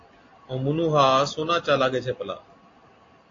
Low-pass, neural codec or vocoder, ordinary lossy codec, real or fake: 7.2 kHz; none; AAC, 32 kbps; real